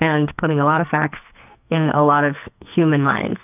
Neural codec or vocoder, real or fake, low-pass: codec, 44.1 kHz, 2.6 kbps, SNAC; fake; 3.6 kHz